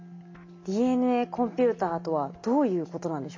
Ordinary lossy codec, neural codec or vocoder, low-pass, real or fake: MP3, 48 kbps; none; 7.2 kHz; real